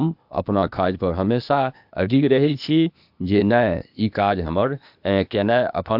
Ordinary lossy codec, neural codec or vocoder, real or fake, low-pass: AAC, 48 kbps; codec, 16 kHz, 0.8 kbps, ZipCodec; fake; 5.4 kHz